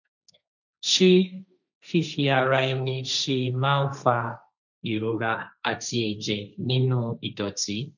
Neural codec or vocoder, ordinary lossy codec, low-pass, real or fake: codec, 16 kHz, 1.1 kbps, Voila-Tokenizer; none; 7.2 kHz; fake